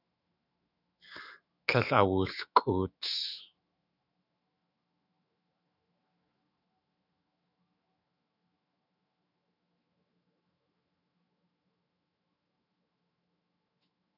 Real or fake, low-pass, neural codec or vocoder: fake; 5.4 kHz; autoencoder, 48 kHz, 128 numbers a frame, DAC-VAE, trained on Japanese speech